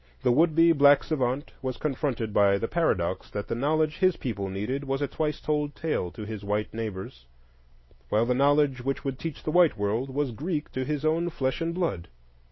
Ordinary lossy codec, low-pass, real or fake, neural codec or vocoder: MP3, 24 kbps; 7.2 kHz; real; none